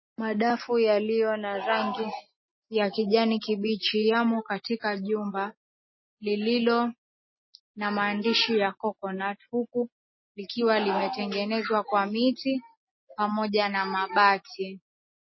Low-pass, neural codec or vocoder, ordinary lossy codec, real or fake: 7.2 kHz; none; MP3, 24 kbps; real